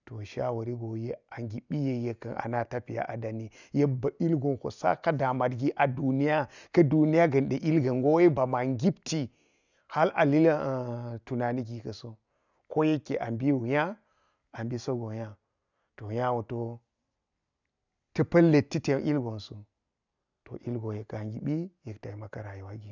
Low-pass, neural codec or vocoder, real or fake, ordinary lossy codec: 7.2 kHz; none; real; none